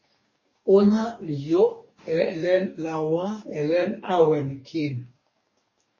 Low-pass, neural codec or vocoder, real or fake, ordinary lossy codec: 7.2 kHz; codec, 44.1 kHz, 2.6 kbps, DAC; fake; MP3, 32 kbps